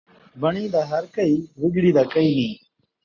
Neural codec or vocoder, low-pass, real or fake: none; 7.2 kHz; real